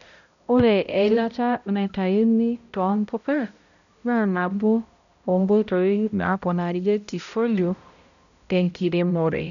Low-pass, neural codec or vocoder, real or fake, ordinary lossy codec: 7.2 kHz; codec, 16 kHz, 0.5 kbps, X-Codec, HuBERT features, trained on balanced general audio; fake; none